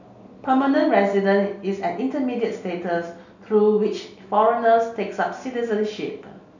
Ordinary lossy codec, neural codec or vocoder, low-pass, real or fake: none; none; 7.2 kHz; real